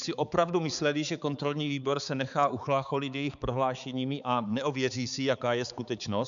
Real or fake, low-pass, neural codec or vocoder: fake; 7.2 kHz; codec, 16 kHz, 4 kbps, X-Codec, HuBERT features, trained on balanced general audio